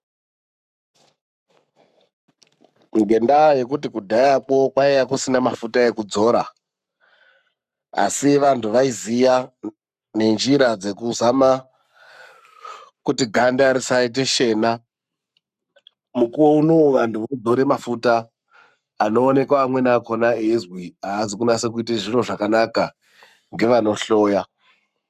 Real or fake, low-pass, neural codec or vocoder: fake; 14.4 kHz; codec, 44.1 kHz, 7.8 kbps, Pupu-Codec